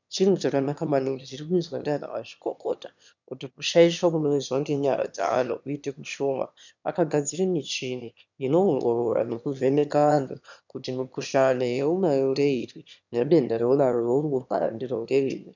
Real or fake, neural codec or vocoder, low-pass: fake; autoencoder, 22.05 kHz, a latent of 192 numbers a frame, VITS, trained on one speaker; 7.2 kHz